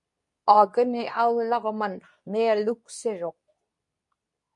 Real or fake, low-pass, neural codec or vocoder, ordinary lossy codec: fake; 10.8 kHz; codec, 24 kHz, 0.9 kbps, WavTokenizer, medium speech release version 2; MP3, 64 kbps